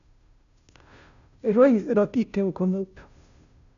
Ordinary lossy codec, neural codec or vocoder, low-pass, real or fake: AAC, 96 kbps; codec, 16 kHz, 0.5 kbps, FunCodec, trained on Chinese and English, 25 frames a second; 7.2 kHz; fake